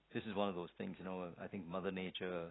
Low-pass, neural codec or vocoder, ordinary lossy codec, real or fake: 7.2 kHz; none; AAC, 16 kbps; real